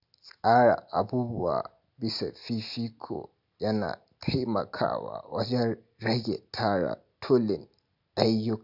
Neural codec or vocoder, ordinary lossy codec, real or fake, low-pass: none; none; real; 5.4 kHz